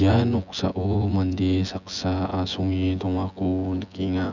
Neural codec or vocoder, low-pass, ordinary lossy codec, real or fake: vocoder, 24 kHz, 100 mel bands, Vocos; 7.2 kHz; none; fake